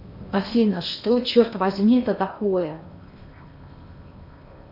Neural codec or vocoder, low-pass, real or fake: codec, 16 kHz in and 24 kHz out, 0.8 kbps, FocalCodec, streaming, 65536 codes; 5.4 kHz; fake